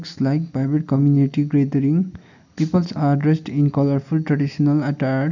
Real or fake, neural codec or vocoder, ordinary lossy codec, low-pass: real; none; none; 7.2 kHz